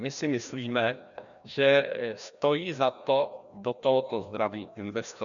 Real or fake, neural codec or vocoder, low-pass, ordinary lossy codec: fake; codec, 16 kHz, 1 kbps, FreqCodec, larger model; 7.2 kHz; MP3, 96 kbps